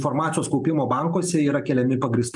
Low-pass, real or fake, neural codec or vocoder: 10.8 kHz; real; none